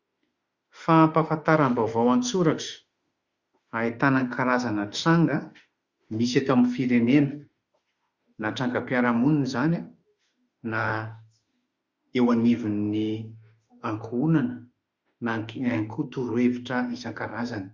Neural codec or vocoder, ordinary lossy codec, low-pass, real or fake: autoencoder, 48 kHz, 32 numbers a frame, DAC-VAE, trained on Japanese speech; Opus, 64 kbps; 7.2 kHz; fake